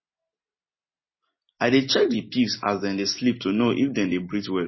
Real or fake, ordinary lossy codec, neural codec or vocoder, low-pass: real; MP3, 24 kbps; none; 7.2 kHz